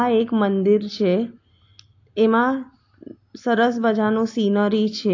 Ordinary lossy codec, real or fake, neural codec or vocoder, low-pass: AAC, 48 kbps; real; none; 7.2 kHz